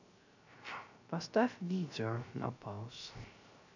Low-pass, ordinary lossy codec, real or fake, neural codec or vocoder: 7.2 kHz; none; fake; codec, 16 kHz, 0.3 kbps, FocalCodec